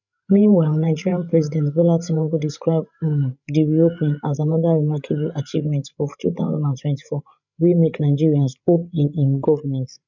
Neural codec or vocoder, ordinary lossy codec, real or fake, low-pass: codec, 16 kHz, 8 kbps, FreqCodec, larger model; none; fake; 7.2 kHz